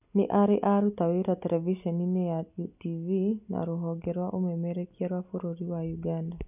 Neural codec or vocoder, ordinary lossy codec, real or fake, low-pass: none; none; real; 3.6 kHz